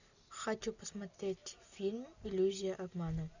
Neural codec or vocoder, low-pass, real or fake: none; 7.2 kHz; real